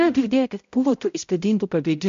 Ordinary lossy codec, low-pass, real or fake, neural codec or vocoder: MP3, 48 kbps; 7.2 kHz; fake; codec, 16 kHz, 0.5 kbps, X-Codec, HuBERT features, trained on balanced general audio